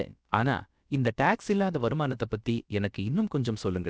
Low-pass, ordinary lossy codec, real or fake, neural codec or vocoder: none; none; fake; codec, 16 kHz, about 1 kbps, DyCAST, with the encoder's durations